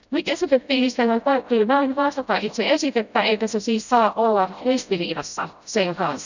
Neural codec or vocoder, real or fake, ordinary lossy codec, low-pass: codec, 16 kHz, 0.5 kbps, FreqCodec, smaller model; fake; none; 7.2 kHz